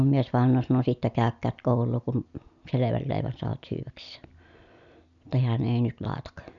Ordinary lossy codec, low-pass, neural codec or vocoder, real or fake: none; 7.2 kHz; none; real